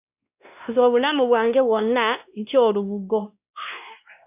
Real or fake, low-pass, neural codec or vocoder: fake; 3.6 kHz; codec, 16 kHz, 1 kbps, X-Codec, WavLM features, trained on Multilingual LibriSpeech